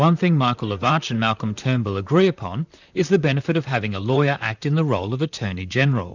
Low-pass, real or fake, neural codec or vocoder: 7.2 kHz; fake; vocoder, 44.1 kHz, 128 mel bands, Pupu-Vocoder